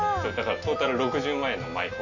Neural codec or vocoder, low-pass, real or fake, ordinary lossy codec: none; 7.2 kHz; real; none